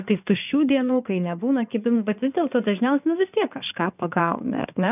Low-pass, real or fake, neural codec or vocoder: 3.6 kHz; fake; codec, 16 kHz in and 24 kHz out, 2.2 kbps, FireRedTTS-2 codec